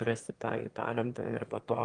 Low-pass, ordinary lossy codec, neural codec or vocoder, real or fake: 9.9 kHz; Opus, 24 kbps; autoencoder, 22.05 kHz, a latent of 192 numbers a frame, VITS, trained on one speaker; fake